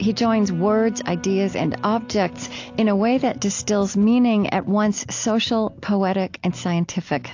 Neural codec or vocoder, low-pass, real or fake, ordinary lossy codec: none; 7.2 kHz; real; AAC, 48 kbps